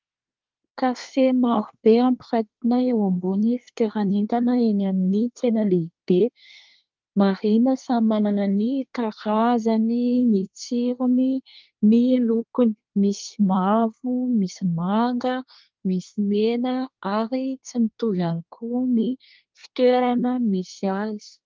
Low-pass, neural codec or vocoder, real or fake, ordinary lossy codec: 7.2 kHz; codec, 24 kHz, 1 kbps, SNAC; fake; Opus, 32 kbps